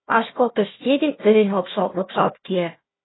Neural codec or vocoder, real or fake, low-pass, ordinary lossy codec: codec, 16 kHz, 1 kbps, FunCodec, trained on Chinese and English, 50 frames a second; fake; 7.2 kHz; AAC, 16 kbps